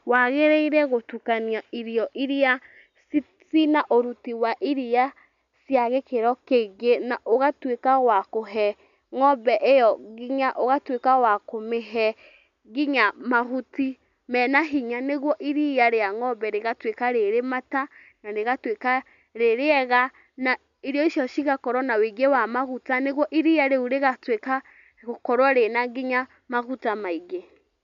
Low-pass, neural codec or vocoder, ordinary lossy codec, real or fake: 7.2 kHz; none; none; real